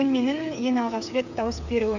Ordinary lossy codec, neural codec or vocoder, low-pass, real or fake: none; codec, 16 kHz, 8 kbps, FreqCodec, smaller model; 7.2 kHz; fake